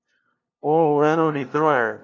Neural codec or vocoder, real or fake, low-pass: codec, 16 kHz, 0.5 kbps, FunCodec, trained on LibriTTS, 25 frames a second; fake; 7.2 kHz